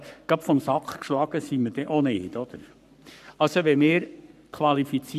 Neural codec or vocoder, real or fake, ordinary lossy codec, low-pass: codec, 44.1 kHz, 7.8 kbps, Pupu-Codec; fake; none; 14.4 kHz